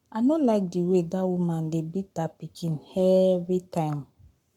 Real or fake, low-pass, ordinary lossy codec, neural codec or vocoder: fake; 19.8 kHz; none; codec, 44.1 kHz, 7.8 kbps, Pupu-Codec